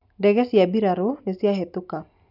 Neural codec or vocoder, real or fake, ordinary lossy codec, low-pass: none; real; none; 5.4 kHz